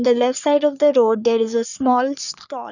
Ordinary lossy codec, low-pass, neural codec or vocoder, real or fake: none; 7.2 kHz; codec, 44.1 kHz, 3.4 kbps, Pupu-Codec; fake